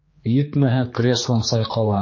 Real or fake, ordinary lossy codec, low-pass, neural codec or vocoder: fake; MP3, 32 kbps; 7.2 kHz; codec, 16 kHz, 2 kbps, X-Codec, HuBERT features, trained on general audio